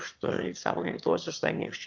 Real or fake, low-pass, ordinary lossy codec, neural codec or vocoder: fake; 7.2 kHz; Opus, 24 kbps; autoencoder, 22.05 kHz, a latent of 192 numbers a frame, VITS, trained on one speaker